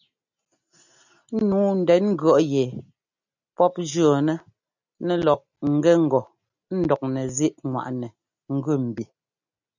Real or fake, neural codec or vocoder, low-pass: real; none; 7.2 kHz